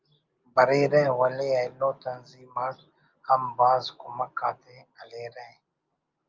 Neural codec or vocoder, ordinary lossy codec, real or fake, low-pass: none; Opus, 24 kbps; real; 7.2 kHz